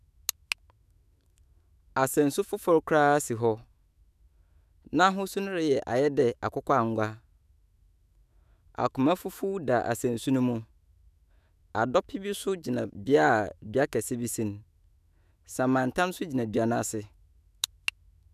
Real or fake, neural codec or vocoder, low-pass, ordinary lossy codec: fake; autoencoder, 48 kHz, 128 numbers a frame, DAC-VAE, trained on Japanese speech; 14.4 kHz; none